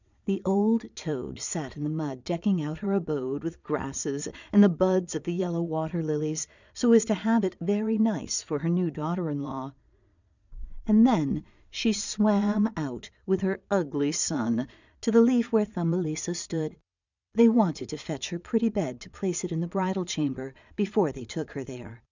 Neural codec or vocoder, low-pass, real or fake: vocoder, 22.05 kHz, 80 mel bands, Vocos; 7.2 kHz; fake